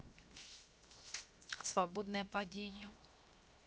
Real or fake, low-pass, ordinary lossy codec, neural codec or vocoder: fake; none; none; codec, 16 kHz, 0.7 kbps, FocalCodec